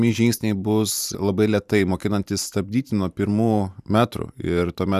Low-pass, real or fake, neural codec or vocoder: 14.4 kHz; fake; vocoder, 44.1 kHz, 128 mel bands every 512 samples, BigVGAN v2